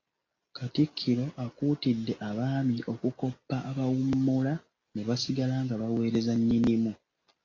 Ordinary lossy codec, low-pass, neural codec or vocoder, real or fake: AAC, 32 kbps; 7.2 kHz; none; real